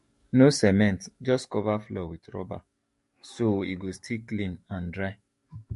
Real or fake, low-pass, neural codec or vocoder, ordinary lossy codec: fake; 14.4 kHz; codec, 44.1 kHz, 7.8 kbps, DAC; MP3, 48 kbps